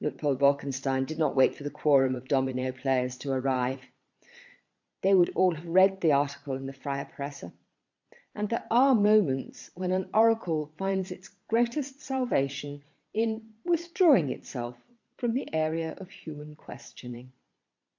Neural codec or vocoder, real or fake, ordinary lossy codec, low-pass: vocoder, 22.05 kHz, 80 mel bands, Vocos; fake; MP3, 64 kbps; 7.2 kHz